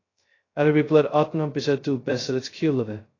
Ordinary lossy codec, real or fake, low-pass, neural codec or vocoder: AAC, 32 kbps; fake; 7.2 kHz; codec, 16 kHz, 0.2 kbps, FocalCodec